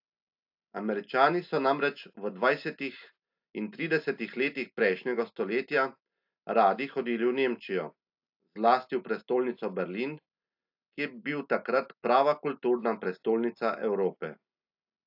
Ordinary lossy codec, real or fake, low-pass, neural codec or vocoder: AAC, 48 kbps; real; 5.4 kHz; none